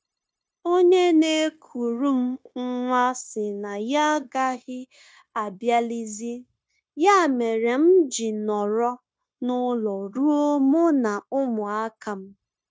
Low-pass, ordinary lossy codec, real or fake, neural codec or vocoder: none; none; fake; codec, 16 kHz, 0.9 kbps, LongCat-Audio-Codec